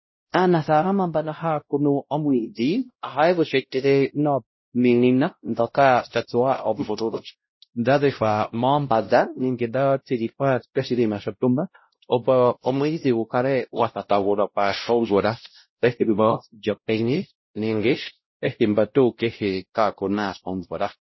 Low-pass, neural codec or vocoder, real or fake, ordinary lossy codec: 7.2 kHz; codec, 16 kHz, 0.5 kbps, X-Codec, WavLM features, trained on Multilingual LibriSpeech; fake; MP3, 24 kbps